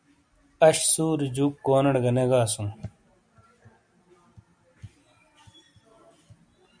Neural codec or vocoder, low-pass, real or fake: none; 9.9 kHz; real